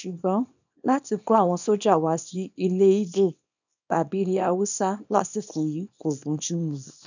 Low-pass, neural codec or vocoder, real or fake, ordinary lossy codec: 7.2 kHz; codec, 24 kHz, 0.9 kbps, WavTokenizer, small release; fake; none